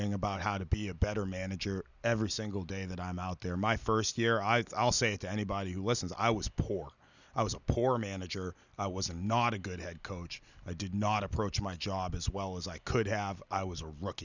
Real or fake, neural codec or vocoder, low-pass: real; none; 7.2 kHz